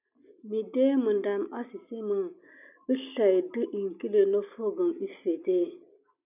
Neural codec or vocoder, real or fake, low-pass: none; real; 3.6 kHz